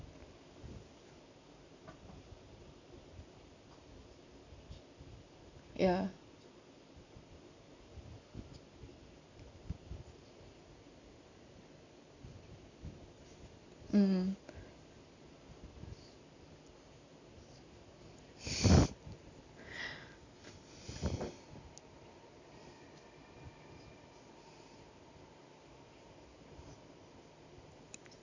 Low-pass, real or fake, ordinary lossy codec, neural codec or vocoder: 7.2 kHz; real; AAC, 48 kbps; none